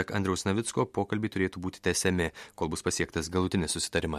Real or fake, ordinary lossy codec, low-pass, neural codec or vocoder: real; MP3, 64 kbps; 14.4 kHz; none